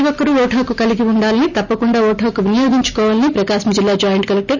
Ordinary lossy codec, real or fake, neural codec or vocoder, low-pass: none; real; none; 7.2 kHz